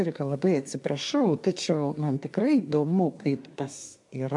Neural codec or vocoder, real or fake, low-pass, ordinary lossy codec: codec, 24 kHz, 1 kbps, SNAC; fake; 10.8 kHz; MP3, 64 kbps